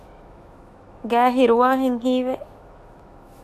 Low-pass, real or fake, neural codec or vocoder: 14.4 kHz; fake; autoencoder, 48 kHz, 128 numbers a frame, DAC-VAE, trained on Japanese speech